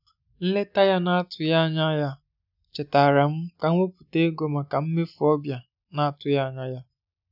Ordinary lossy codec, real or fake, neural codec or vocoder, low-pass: none; real; none; 5.4 kHz